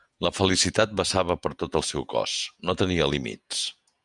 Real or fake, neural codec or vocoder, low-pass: fake; vocoder, 48 kHz, 128 mel bands, Vocos; 10.8 kHz